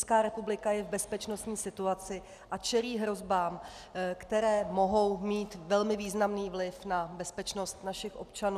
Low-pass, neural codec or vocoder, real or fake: 14.4 kHz; none; real